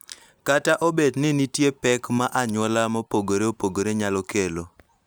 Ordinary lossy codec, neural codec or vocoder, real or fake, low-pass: none; none; real; none